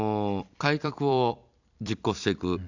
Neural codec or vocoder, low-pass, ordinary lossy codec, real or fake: vocoder, 44.1 kHz, 128 mel bands every 256 samples, BigVGAN v2; 7.2 kHz; none; fake